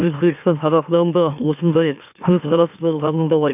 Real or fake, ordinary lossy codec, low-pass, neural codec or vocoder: fake; none; 3.6 kHz; autoencoder, 44.1 kHz, a latent of 192 numbers a frame, MeloTTS